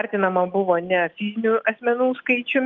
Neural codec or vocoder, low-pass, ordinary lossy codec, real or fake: none; 7.2 kHz; Opus, 32 kbps; real